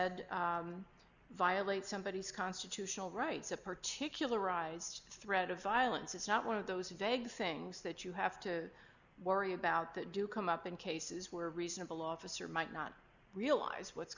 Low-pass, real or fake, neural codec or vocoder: 7.2 kHz; real; none